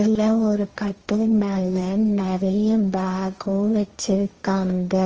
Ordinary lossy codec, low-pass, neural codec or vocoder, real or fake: Opus, 24 kbps; 7.2 kHz; codec, 16 kHz, 1.1 kbps, Voila-Tokenizer; fake